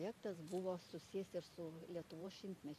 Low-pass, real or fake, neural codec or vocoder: 14.4 kHz; fake; vocoder, 44.1 kHz, 128 mel bands every 512 samples, BigVGAN v2